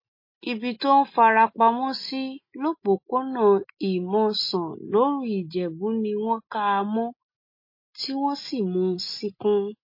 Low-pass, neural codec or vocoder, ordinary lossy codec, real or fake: 5.4 kHz; none; MP3, 24 kbps; real